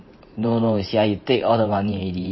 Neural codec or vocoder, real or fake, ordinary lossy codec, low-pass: vocoder, 44.1 kHz, 128 mel bands every 512 samples, BigVGAN v2; fake; MP3, 24 kbps; 7.2 kHz